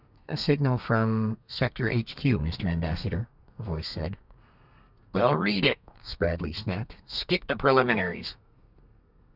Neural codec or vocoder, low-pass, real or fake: codec, 32 kHz, 1.9 kbps, SNAC; 5.4 kHz; fake